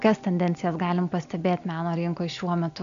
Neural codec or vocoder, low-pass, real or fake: none; 7.2 kHz; real